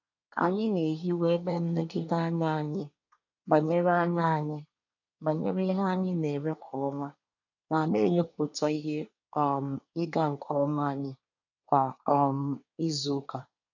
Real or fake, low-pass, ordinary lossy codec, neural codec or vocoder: fake; 7.2 kHz; none; codec, 24 kHz, 1 kbps, SNAC